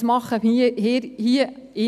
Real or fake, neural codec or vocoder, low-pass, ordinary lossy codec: real; none; 14.4 kHz; none